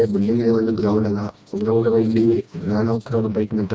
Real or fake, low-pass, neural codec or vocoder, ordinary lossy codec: fake; none; codec, 16 kHz, 1 kbps, FreqCodec, smaller model; none